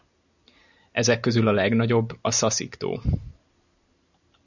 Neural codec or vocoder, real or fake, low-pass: none; real; 7.2 kHz